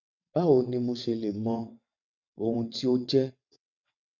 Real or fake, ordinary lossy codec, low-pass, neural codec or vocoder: fake; none; 7.2 kHz; vocoder, 22.05 kHz, 80 mel bands, WaveNeXt